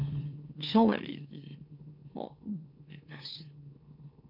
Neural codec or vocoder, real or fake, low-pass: autoencoder, 44.1 kHz, a latent of 192 numbers a frame, MeloTTS; fake; 5.4 kHz